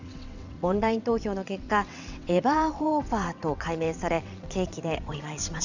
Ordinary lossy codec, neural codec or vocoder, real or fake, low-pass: none; vocoder, 22.05 kHz, 80 mel bands, WaveNeXt; fake; 7.2 kHz